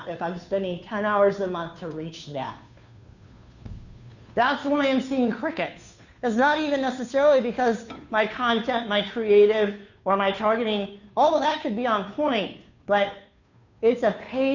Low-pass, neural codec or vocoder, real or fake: 7.2 kHz; codec, 16 kHz, 2 kbps, FunCodec, trained on Chinese and English, 25 frames a second; fake